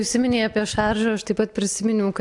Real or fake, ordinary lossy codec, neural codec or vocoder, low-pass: real; AAC, 64 kbps; none; 10.8 kHz